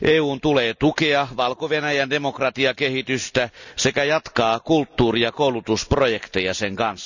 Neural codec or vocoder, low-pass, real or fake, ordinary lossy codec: none; 7.2 kHz; real; none